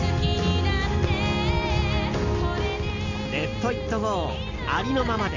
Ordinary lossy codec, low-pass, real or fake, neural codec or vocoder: none; 7.2 kHz; real; none